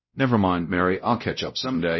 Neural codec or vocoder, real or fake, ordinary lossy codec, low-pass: codec, 16 kHz, 0.5 kbps, X-Codec, WavLM features, trained on Multilingual LibriSpeech; fake; MP3, 24 kbps; 7.2 kHz